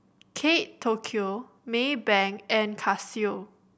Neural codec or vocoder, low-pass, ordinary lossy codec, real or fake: none; none; none; real